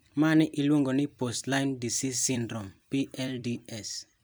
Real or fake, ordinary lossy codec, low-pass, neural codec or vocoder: fake; none; none; vocoder, 44.1 kHz, 128 mel bands every 256 samples, BigVGAN v2